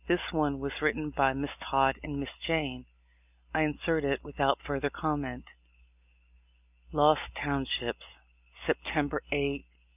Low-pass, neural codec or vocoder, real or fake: 3.6 kHz; none; real